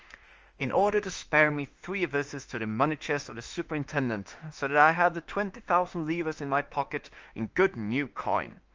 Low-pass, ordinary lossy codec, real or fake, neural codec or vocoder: 7.2 kHz; Opus, 24 kbps; fake; autoencoder, 48 kHz, 32 numbers a frame, DAC-VAE, trained on Japanese speech